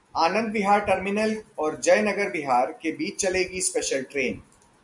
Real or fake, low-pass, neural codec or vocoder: real; 10.8 kHz; none